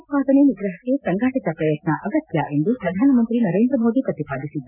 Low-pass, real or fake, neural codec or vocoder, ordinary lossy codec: 3.6 kHz; real; none; none